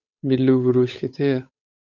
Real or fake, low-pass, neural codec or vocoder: fake; 7.2 kHz; codec, 16 kHz, 8 kbps, FunCodec, trained on Chinese and English, 25 frames a second